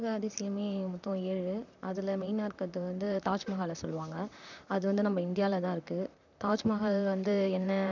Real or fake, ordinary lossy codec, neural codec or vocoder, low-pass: fake; none; vocoder, 44.1 kHz, 128 mel bands, Pupu-Vocoder; 7.2 kHz